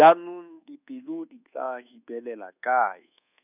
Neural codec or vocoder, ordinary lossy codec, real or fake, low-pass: codec, 24 kHz, 1.2 kbps, DualCodec; none; fake; 3.6 kHz